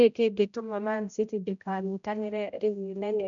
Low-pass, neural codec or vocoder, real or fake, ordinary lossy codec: 7.2 kHz; codec, 16 kHz, 0.5 kbps, X-Codec, HuBERT features, trained on general audio; fake; none